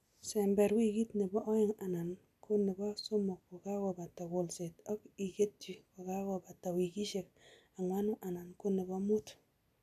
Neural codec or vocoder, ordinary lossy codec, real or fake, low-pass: none; none; real; 14.4 kHz